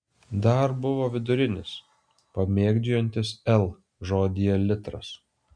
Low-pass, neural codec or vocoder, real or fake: 9.9 kHz; none; real